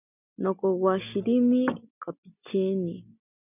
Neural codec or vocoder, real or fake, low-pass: none; real; 3.6 kHz